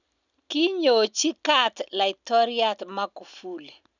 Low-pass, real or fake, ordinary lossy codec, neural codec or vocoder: 7.2 kHz; real; none; none